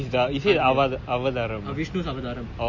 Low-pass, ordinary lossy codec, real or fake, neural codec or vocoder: 7.2 kHz; MP3, 32 kbps; real; none